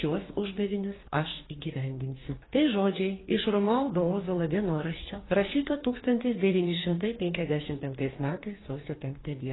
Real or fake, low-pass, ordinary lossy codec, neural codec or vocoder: fake; 7.2 kHz; AAC, 16 kbps; codec, 44.1 kHz, 2.6 kbps, DAC